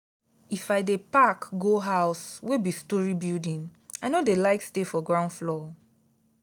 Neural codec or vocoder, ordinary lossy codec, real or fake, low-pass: none; none; real; none